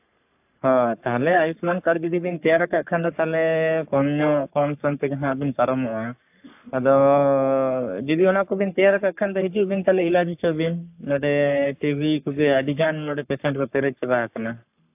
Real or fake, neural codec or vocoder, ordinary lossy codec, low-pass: fake; codec, 44.1 kHz, 3.4 kbps, Pupu-Codec; AAC, 32 kbps; 3.6 kHz